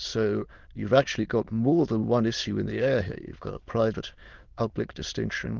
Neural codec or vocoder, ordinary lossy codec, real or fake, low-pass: autoencoder, 22.05 kHz, a latent of 192 numbers a frame, VITS, trained on many speakers; Opus, 16 kbps; fake; 7.2 kHz